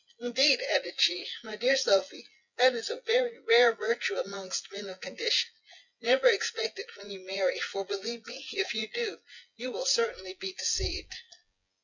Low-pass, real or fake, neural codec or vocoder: 7.2 kHz; fake; vocoder, 24 kHz, 100 mel bands, Vocos